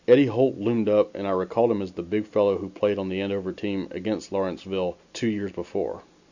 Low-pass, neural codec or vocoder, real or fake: 7.2 kHz; none; real